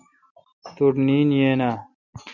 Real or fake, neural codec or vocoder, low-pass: real; none; 7.2 kHz